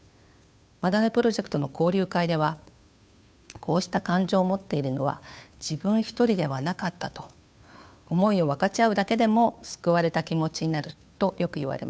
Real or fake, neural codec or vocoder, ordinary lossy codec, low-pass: fake; codec, 16 kHz, 2 kbps, FunCodec, trained on Chinese and English, 25 frames a second; none; none